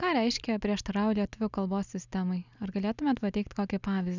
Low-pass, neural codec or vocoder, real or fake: 7.2 kHz; none; real